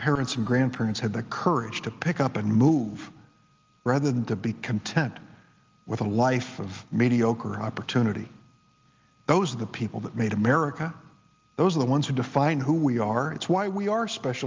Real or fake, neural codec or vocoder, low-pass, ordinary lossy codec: real; none; 7.2 kHz; Opus, 24 kbps